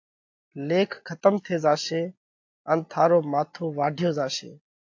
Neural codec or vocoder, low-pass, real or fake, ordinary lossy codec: none; 7.2 kHz; real; AAC, 48 kbps